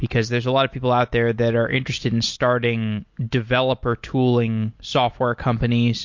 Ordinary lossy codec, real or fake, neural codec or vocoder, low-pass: MP3, 48 kbps; real; none; 7.2 kHz